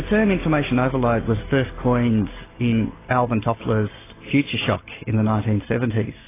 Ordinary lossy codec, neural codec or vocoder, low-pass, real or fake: AAC, 16 kbps; none; 3.6 kHz; real